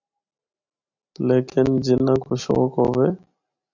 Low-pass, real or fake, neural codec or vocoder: 7.2 kHz; real; none